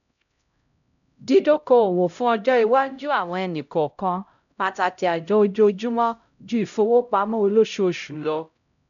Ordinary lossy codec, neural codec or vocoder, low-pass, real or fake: none; codec, 16 kHz, 0.5 kbps, X-Codec, HuBERT features, trained on LibriSpeech; 7.2 kHz; fake